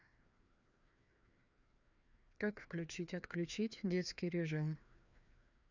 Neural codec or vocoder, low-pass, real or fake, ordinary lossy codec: codec, 16 kHz, 2 kbps, FreqCodec, larger model; 7.2 kHz; fake; none